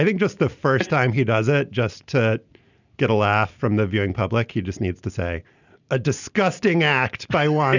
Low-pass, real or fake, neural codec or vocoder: 7.2 kHz; real; none